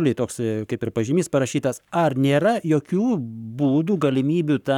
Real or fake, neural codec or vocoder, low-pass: fake; codec, 44.1 kHz, 7.8 kbps, Pupu-Codec; 19.8 kHz